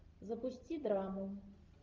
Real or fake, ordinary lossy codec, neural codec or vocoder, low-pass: real; Opus, 32 kbps; none; 7.2 kHz